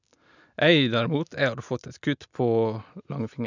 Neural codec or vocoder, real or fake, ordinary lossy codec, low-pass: none; real; none; 7.2 kHz